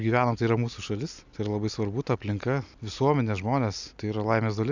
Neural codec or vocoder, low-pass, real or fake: none; 7.2 kHz; real